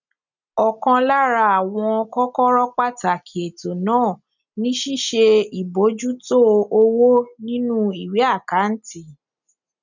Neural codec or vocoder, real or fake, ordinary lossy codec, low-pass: none; real; none; 7.2 kHz